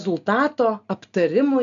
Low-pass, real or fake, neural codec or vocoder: 7.2 kHz; real; none